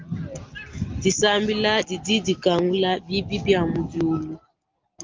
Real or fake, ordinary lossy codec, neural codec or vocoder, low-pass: real; Opus, 32 kbps; none; 7.2 kHz